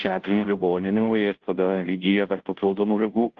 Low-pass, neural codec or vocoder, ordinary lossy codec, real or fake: 7.2 kHz; codec, 16 kHz, 0.5 kbps, FunCodec, trained on Chinese and English, 25 frames a second; Opus, 16 kbps; fake